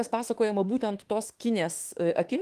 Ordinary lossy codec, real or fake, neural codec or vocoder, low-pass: Opus, 24 kbps; fake; autoencoder, 48 kHz, 32 numbers a frame, DAC-VAE, trained on Japanese speech; 14.4 kHz